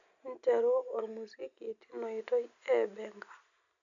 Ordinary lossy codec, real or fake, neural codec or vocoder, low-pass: none; real; none; 7.2 kHz